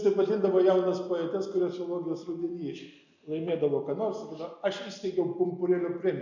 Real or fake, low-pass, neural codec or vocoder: real; 7.2 kHz; none